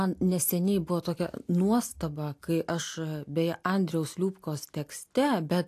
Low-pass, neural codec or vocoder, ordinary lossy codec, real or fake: 14.4 kHz; none; AAC, 64 kbps; real